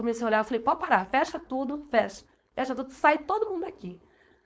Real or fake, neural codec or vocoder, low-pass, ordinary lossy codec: fake; codec, 16 kHz, 4.8 kbps, FACodec; none; none